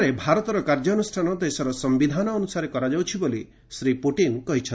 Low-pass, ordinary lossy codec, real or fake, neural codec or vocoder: none; none; real; none